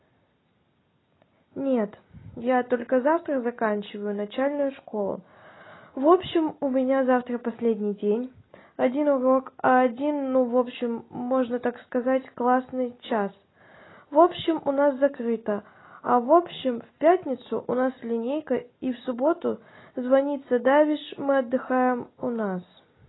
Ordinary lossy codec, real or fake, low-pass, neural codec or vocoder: AAC, 16 kbps; real; 7.2 kHz; none